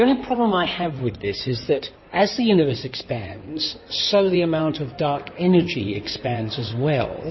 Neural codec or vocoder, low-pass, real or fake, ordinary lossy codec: codec, 16 kHz in and 24 kHz out, 2.2 kbps, FireRedTTS-2 codec; 7.2 kHz; fake; MP3, 24 kbps